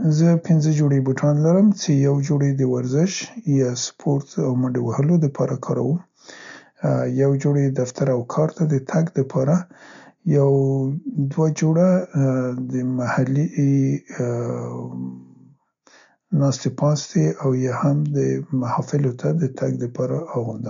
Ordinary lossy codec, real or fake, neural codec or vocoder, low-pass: MP3, 64 kbps; real; none; 7.2 kHz